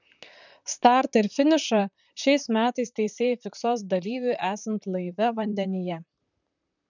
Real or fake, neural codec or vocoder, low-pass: fake; vocoder, 44.1 kHz, 128 mel bands, Pupu-Vocoder; 7.2 kHz